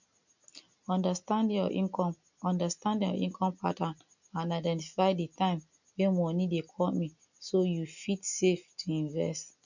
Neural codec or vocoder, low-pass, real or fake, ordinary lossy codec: none; 7.2 kHz; real; none